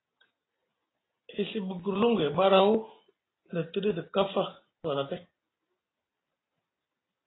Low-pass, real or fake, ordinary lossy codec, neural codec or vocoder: 7.2 kHz; real; AAC, 16 kbps; none